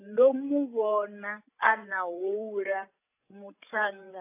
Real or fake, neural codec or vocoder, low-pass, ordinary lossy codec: fake; codec, 16 kHz, 8 kbps, FreqCodec, larger model; 3.6 kHz; AAC, 24 kbps